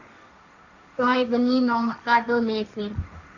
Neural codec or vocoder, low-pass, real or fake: codec, 16 kHz, 1.1 kbps, Voila-Tokenizer; 7.2 kHz; fake